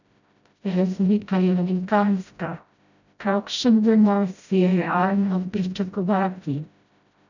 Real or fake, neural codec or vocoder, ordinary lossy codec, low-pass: fake; codec, 16 kHz, 0.5 kbps, FreqCodec, smaller model; none; 7.2 kHz